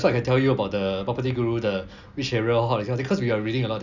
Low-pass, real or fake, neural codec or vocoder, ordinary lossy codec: 7.2 kHz; real; none; none